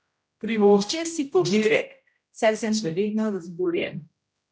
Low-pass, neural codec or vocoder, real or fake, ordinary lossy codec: none; codec, 16 kHz, 0.5 kbps, X-Codec, HuBERT features, trained on general audio; fake; none